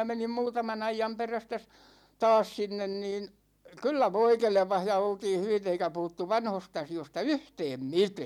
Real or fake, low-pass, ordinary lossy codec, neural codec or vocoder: real; 19.8 kHz; none; none